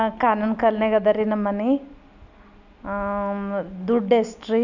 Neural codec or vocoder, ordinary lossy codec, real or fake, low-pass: none; none; real; 7.2 kHz